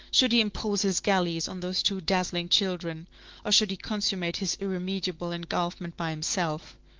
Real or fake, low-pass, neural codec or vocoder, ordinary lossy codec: fake; 7.2 kHz; autoencoder, 48 kHz, 128 numbers a frame, DAC-VAE, trained on Japanese speech; Opus, 16 kbps